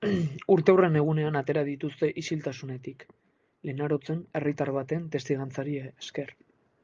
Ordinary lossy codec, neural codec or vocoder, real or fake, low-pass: Opus, 32 kbps; none; real; 7.2 kHz